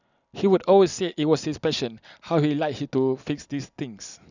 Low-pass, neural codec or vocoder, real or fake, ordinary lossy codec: 7.2 kHz; none; real; none